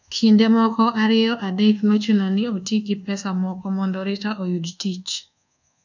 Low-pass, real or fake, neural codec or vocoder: 7.2 kHz; fake; codec, 24 kHz, 1.2 kbps, DualCodec